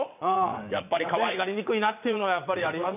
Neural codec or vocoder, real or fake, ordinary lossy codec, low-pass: vocoder, 22.05 kHz, 80 mel bands, WaveNeXt; fake; none; 3.6 kHz